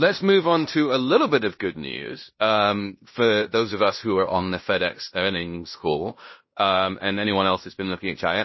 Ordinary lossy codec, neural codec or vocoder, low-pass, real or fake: MP3, 24 kbps; codec, 16 kHz in and 24 kHz out, 0.9 kbps, LongCat-Audio-Codec, fine tuned four codebook decoder; 7.2 kHz; fake